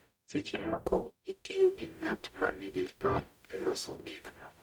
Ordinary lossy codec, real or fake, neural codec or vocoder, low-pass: none; fake; codec, 44.1 kHz, 0.9 kbps, DAC; none